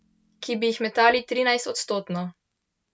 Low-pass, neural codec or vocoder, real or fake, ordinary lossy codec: none; none; real; none